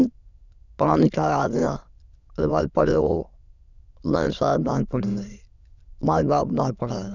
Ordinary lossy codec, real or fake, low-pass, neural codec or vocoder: none; fake; 7.2 kHz; autoencoder, 22.05 kHz, a latent of 192 numbers a frame, VITS, trained on many speakers